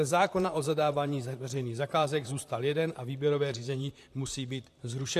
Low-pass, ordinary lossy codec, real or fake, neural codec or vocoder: 14.4 kHz; AAC, 64 kbps; fake; vocoder, 44.1 kHz, 128 mel bands, Pupu-Vocoder